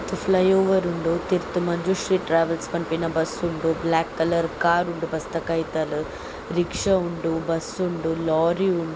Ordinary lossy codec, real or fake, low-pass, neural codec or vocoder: none; real; none; none